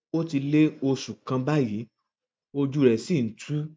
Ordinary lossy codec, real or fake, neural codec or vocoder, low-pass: none; real; none; none